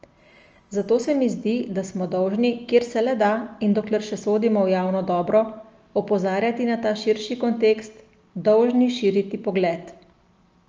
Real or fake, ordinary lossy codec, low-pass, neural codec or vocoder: real; Opus, 24 kbps; 7.2 kHz; none